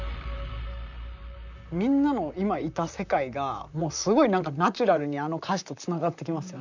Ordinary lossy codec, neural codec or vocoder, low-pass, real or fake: none; vocoder, 44.1 kHz, 128 mel bands, Pupu-Vocoder; 7.2 kHz; fake